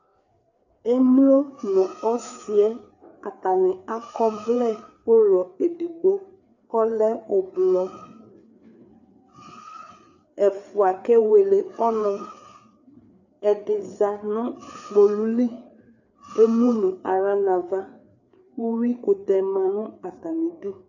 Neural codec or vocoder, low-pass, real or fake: codec, 16 kHz, 4 kbps, FreqCodec, larger model; 7.2 kHz; fake